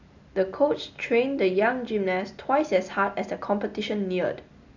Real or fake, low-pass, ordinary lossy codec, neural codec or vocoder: real; 7.2 kHz; none; none